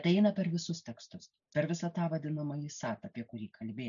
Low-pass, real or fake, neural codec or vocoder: 7.2 kHz; real; none